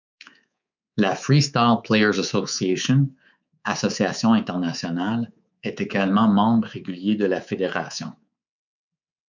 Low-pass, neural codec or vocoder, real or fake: 7.2 kHz; codec, 24 kHz, 3.1 kbps, DualCodec; fake